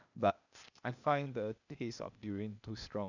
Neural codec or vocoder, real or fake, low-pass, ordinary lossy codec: codec, 16 kHz, 0.8 kbps, ZipCodec; fake; 7.2 kHz; none